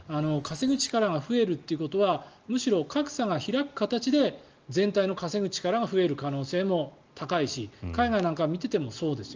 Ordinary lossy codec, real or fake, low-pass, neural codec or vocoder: Opus, 24 kbps; real; 7.2 kHz; none